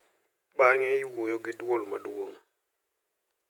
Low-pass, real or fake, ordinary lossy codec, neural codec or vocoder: 19.8 kHz; fake; none; vocoder, 48 kHz, 128 mel bands, Vocos